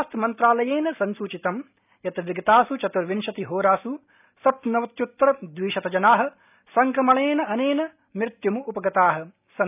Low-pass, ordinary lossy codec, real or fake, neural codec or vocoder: 3.6 kHz; none; real; none